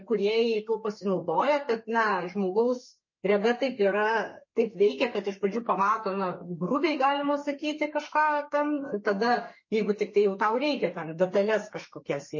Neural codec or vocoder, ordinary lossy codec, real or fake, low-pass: codec, 32 kHz, 1.9 kbps, SNAC; MP3, 32 kbps; fake; 7.2 kHz